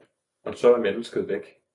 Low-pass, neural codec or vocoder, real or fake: 10.8 kHz; none; real